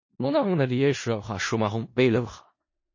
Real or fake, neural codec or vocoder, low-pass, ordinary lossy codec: fake; codec, 16 kHz in and 24 kHz out, 0.4 kbps, LongCat-Audio-Codec, four codebook decoder; 7.2 kHz; MP3, 32 kbps